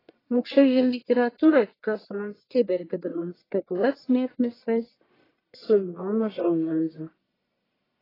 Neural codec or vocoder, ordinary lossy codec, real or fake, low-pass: codec, 44.1 kHz, 1.7 kbps, Pupu-Codec; AAC, 24 kbps; fake; 5.4 kHz